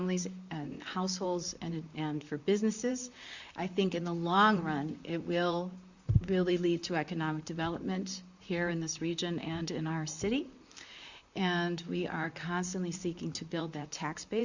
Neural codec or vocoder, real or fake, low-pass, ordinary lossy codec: vocoder, 44.1 kHz, 128 mel bands, Pupu-Vocoder; fake; 7.2 kHz; Opus, 64 kbps